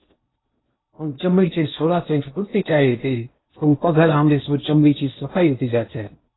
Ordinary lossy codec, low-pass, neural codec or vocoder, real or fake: AAC, 16 kbps; 7.2 kHz; codec, 16 kHz in and 24 kHz out, 0.8 kbps, FocalCodec, streaming, 65536 codes; fake